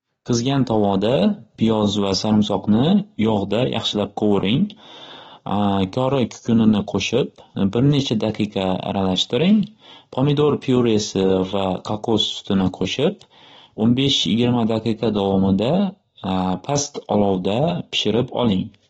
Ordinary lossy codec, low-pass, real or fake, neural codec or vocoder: AAC, 24 kbps; 10.8 kHz; real; none